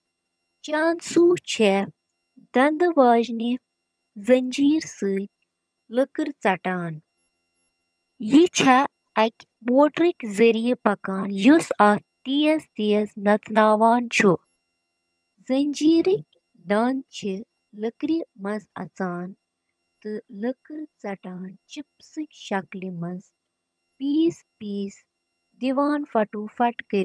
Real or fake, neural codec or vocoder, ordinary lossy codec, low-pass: fake; vocoder, 22.05 kHz, 80 mel bands, HiFi-GAN; none; none